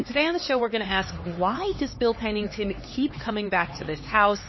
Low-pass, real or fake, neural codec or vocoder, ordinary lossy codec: 7.2 kHz; fake; codec, 16 kHz, 4 kbps, X-Codec, HuBERT features, trained on LibriSpeech; MP3, 24 kbps